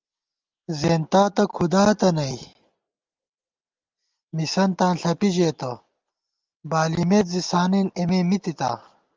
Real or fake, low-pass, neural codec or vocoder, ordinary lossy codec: real; 7.2 kHz; none; Opus, 32 kbps